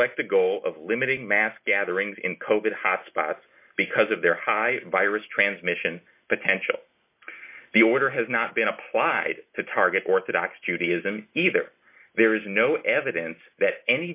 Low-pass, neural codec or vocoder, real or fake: 3.6 kHz; none; real